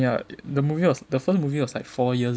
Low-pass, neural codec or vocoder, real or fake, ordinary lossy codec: none; none; real; none